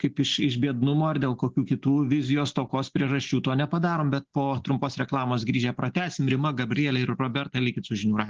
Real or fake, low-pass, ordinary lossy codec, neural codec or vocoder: real; 7.2 kHz; Opus, 16 kbps; none